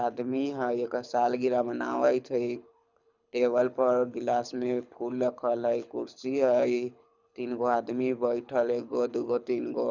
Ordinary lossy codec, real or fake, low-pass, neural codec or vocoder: none; fake; 7.2 kHz; codec, 24 kHz, 6 kbps, HILCodec